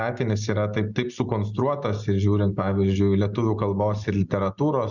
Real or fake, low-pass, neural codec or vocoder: real; 7.2 kHz; none